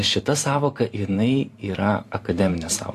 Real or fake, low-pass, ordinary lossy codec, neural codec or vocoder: fake; 14.4 kHz; AAC, 64 kbps; vocoder, 44.1 kHz, 128 mel bands every 512 samples, BigVGAN v2